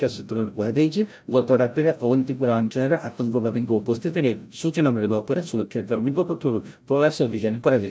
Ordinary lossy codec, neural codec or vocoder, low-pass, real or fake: none; codec, 16 kHz, 0.5 kbps, FreqCodec, larger model; none; fake